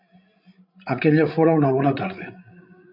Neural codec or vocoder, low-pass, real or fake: codec, 16 kHz, 16 kbps, FreqCodec, larger model; 5.4 kHz; fake